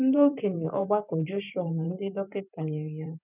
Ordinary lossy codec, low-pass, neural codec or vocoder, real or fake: none; 3.6 kHz; vocoder, 44.1 kHz, 128 mel bands every 512 samples, BigVGAN v2; fake